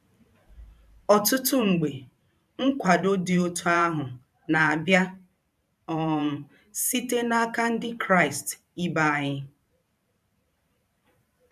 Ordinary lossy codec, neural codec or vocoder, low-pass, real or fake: none; vocoder, 44.1 kHz, 128 mel bands every 512 samples, BigVGAN v2; 14.4 kHz; fake